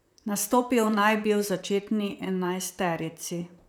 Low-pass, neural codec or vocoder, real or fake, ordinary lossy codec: none; vocoder, 44.1 kHz, 128 mel bands, Pupu-Vocoder; fake; none